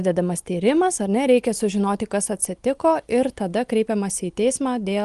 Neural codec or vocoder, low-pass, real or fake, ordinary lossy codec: none; 10.8 kHz; real; Opus, 24 kbps